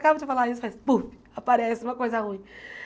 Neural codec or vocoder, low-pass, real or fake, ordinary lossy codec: none; none; real; none